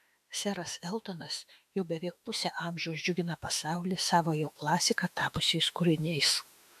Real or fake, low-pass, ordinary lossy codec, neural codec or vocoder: fake; 14.4 kHz; AAC, 96 kbps; autoencoder, 48 kHz, 32 numbers a frame, DAC-VAE, trained on Japanese speech